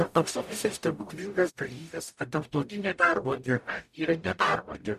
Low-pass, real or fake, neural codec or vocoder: 14.4 kHz; fake; codec, 44.1 kHz, 0.9 kbps, DAC